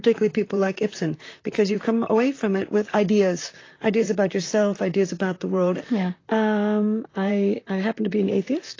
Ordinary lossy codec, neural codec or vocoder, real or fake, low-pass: AAC, 32 kbps; vocoder, 44.1 kHz, 128 mel bands, Pupu-Vocoder; fake; 7.2 kHz